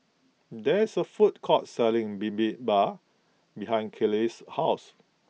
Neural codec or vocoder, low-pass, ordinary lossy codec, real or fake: none; none; none; real